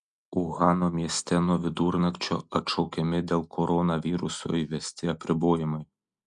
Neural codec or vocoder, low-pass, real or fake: none; 10.8 kHz; real